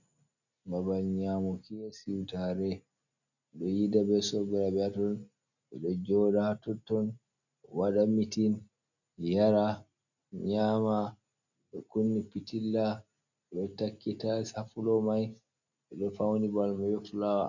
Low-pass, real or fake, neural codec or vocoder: 7.2 kHz; real; none